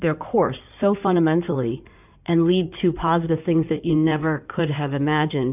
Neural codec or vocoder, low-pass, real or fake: codec, 16 kHz in and 24 kHz out, 2.2 kbps, FireRedTTS-2 codec; 3.6 kHz; fake